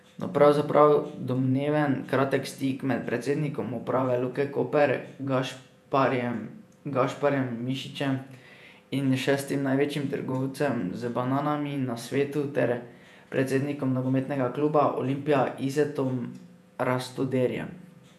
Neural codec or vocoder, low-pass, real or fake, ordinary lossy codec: vocoder, 48 kHz, 128 mel bands, Vocos; 14.4 kHz; fake; none